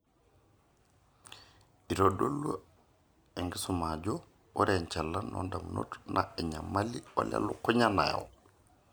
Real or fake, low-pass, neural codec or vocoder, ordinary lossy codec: real; none; none; none